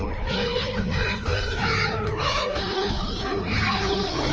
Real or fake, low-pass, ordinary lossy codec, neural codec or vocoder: fake; 7.2 kHz; Opus, 24 kbps; codec, 16 kHz, 2 kbps, FreqCodec, larger model